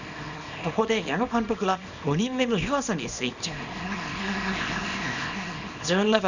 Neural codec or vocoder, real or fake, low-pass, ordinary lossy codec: codec, 24 kHz, 0.9 kbps, WavTokenizer, small release; fake; 7.2 kHz; none